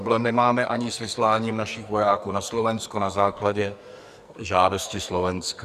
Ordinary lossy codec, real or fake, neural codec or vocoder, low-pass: Opus, 64 kbps; fake; codec, 44.1 kHz, 2.6 kbps, SNAC; 14.4 kHz